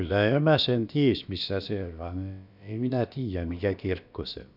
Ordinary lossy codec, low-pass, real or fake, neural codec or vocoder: none; 5.4 kHz; fake; codec, 16 kHz, about 1 kbps, DyCAST, with the encoder's durations